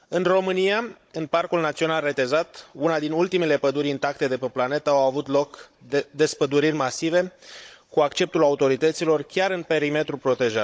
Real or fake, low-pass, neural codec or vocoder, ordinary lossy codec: fake; none; codec, 16 kHz, 16 kbps, FunCodec, trained on Chinese and English, 50 frames a second; none